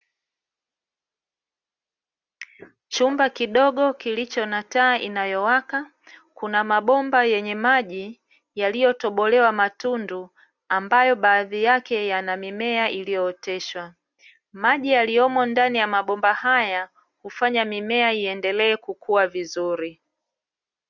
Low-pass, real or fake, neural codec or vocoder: 7.2 kHz; real; none